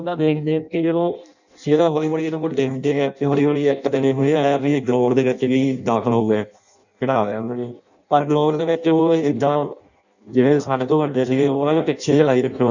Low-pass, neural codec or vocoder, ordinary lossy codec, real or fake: 7.2 kHz; codec, 16 kHz in and 24 kHz out, 0.6 kbps, FireRedTTS-2 codec; none; fake